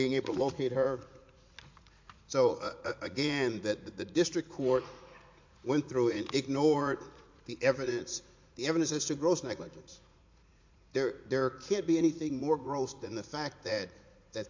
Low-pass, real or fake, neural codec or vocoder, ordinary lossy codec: 7.2 kHz; fake; vocoder, 44.1 kHz, 80 mel bands, Vocos; MP3, 48 kbps